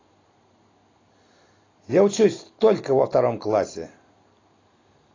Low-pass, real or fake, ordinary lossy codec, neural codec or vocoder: 7.2 kHz; real; AAC, 32 kbps; none